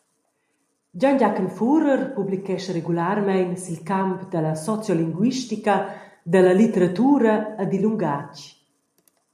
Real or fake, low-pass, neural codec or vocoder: real; 14.4 kHz; none